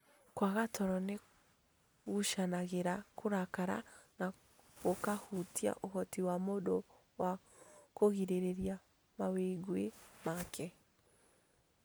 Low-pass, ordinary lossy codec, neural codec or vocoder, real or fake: none; none; none; real